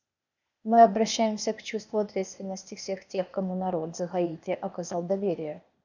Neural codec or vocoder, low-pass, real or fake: codec, 16 kHz, 0.8 kbps, ZipCodec; 7.2 kHz; fake